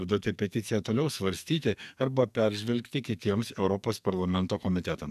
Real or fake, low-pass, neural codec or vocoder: fake; 14.4 kHz; codec, 44.1 kHz, 2.6 kbps, SNAC